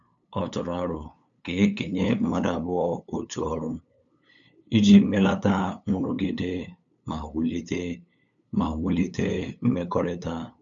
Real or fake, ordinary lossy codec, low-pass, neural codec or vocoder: fake; none; 7.2 kHz; codec, 16 kHz, 8 kbps, FunCodec, trained on LibriTTS, 25 frames a second